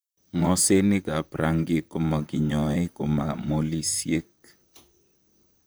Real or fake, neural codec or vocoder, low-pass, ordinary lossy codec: fake; vocoder, 44.1 kHz, 128 mel bands, Pupu-Vocoder; none; none